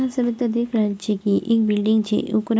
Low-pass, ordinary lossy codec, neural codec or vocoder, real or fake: none; none; none; real